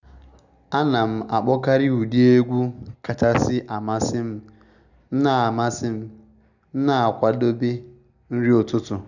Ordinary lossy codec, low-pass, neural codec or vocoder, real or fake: none; 7.2 kHz; none; real